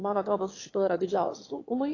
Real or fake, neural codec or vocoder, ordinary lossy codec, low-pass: fake; autoencoder, 22.05 kHz, a latent of 192 numbers a frame, VITS, trained on one speaker; AAC, 32 kbps; 7.2 kHz